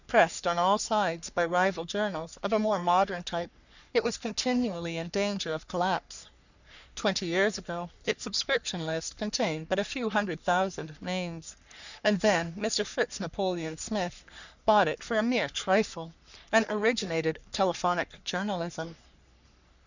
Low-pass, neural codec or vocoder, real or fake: 7.2 kHz; codec, 44.1 kHz, 3.4 kbps, Pupu-Codec; fake